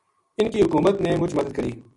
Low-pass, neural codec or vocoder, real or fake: 10.8 kHz; none; real